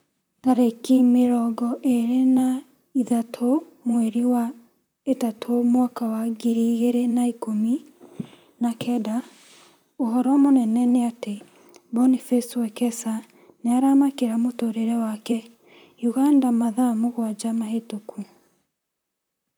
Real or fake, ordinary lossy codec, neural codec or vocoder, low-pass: fake; none; vocoder, 44.1 kHz, 128 mel bands, Pupu-Vocoder; none